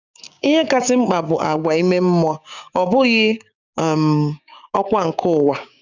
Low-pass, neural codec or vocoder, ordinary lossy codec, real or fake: 7.2 kHz; codec, 16 kHz, 6 kbps, DAC; none; fake